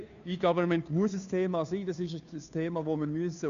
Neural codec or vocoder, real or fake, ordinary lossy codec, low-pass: codec, 16 kHz, 2 kbps, FunCodec, trained on Chinese and English, 25 frames a second; fake; none; 7.2 kHz